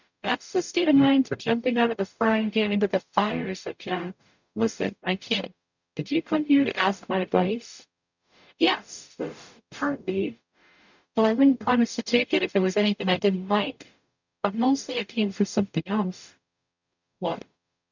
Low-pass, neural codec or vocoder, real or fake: 7.2 kHz; codec, 44.1 kHz, 0.9 kbps, DAC; fake